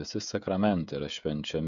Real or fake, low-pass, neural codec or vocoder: fake; 7.2 kHz; codec, 16 kHz, 16 kbps, FreqCodec, smaller model